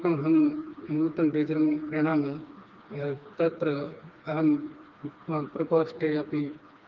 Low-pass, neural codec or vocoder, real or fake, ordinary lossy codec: 7.2 kHz; codec, 16 kHz, 2 kbps, FreqCodec, smaller model; fake; Opus, 32 kbps